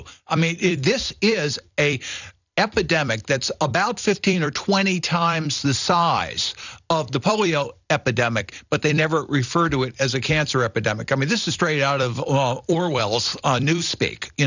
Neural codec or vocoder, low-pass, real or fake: vocoder, 44.1 kHz, 128 mel bands every 256 samples, BigVGAN v2; 7.2 kHz; fake